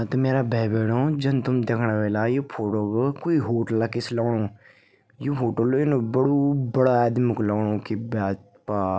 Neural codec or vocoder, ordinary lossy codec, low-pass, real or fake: none; none; none; real